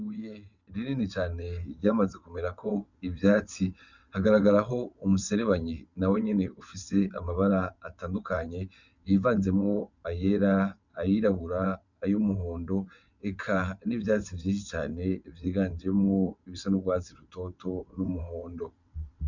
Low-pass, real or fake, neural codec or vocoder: 7.2 kHz; fake; vocoder, 22.05 kHz, 80 mel bands, WaveNeXt